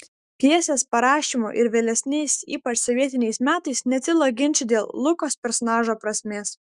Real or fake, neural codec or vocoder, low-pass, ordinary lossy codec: fake; autoencoder, 48 kHz, 128 numbers a frame, DAC-VAE, trained on Japanese speech; 10.8 kHz; Opus, 64 kbps